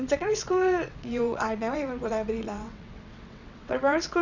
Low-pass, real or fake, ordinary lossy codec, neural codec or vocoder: 7.2 kHz; fake; none; vocoder, 22.05 kHz, 80 mel bands, WaveNeXt